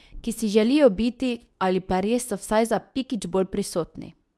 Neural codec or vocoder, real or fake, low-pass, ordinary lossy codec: codec, 24 kHz, 0.9 kbps, WavTokenizer, medium speech release version 2; fake; none; none